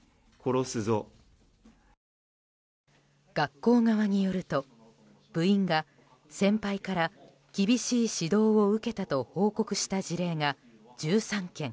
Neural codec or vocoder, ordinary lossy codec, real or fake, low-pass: none; none; real; none